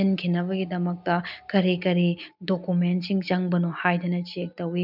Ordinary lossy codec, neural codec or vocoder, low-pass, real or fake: none; none; 5.4 kHz; real